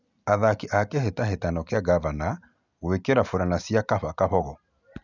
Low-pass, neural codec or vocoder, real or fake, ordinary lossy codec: 7.2 kHz; none; real; none